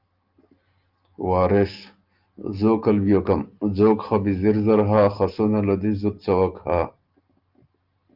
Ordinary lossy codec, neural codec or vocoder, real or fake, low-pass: Opus, 24 kbps; none; real; 5.4 kHz